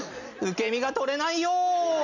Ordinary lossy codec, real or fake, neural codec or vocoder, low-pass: none; real; none; 7.2 kHz